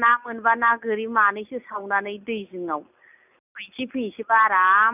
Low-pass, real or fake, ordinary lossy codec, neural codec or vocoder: 3.6 kHz; real; none; none